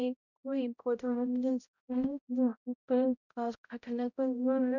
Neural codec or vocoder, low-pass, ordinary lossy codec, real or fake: codec, 16 kHz, 0.5 kbps, X-Codec, HuBERT features, trained on balanced general audio; 7.2 kHz; none; fake